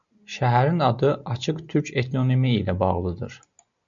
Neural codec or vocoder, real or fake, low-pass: none; real; 7.2 kHz